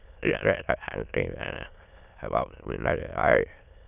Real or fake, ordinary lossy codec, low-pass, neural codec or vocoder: fake; none; 3.6 kHz; autoencoder, 22.05 kHz, a latent of 192 numbers a frame, VITS, trained on many speakers